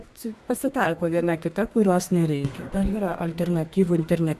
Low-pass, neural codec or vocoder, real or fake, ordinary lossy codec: 14.4 kHz; codec, 32 kHz, 1.9 kbps, SNAC; fake; MP3, 96 kbps